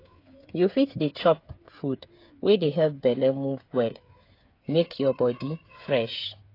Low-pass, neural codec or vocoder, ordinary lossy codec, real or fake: 5.4 kHz; codec, 16 kHz, 8 kbps, FreqCodec, smaller model; AAC, 32 kbps; fake